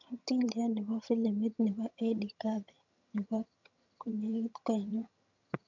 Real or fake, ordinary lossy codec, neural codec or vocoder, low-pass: fake; none; vocoder, 22.05 kHz, 80 mel bands, HiFi-GAN; 7.2 kHz